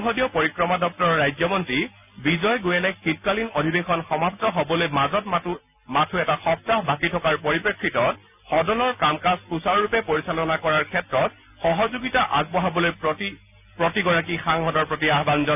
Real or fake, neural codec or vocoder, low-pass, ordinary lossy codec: real; none; 3.6 kHz; none